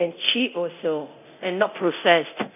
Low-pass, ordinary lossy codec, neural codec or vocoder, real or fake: 3.6 kHz; none; codec, 24 kHz, 0.9 kbps, DualCodec; fake